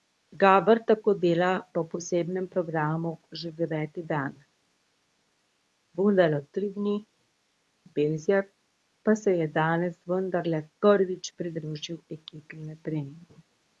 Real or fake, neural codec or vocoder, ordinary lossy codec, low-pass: fake; codec, 24 kHz, 0.9 kbps, WavTokenizer, medium speech release version 2; none; none